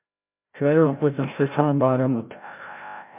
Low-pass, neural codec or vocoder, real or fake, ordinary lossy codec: 3.6 kHz; codec, 16 kHz, 0.5 kbps, FreqCodec, larger model; fake; MP3, 32 kbps